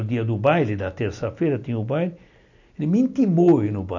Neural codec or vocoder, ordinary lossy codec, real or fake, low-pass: none; none; real; 7.2 kHz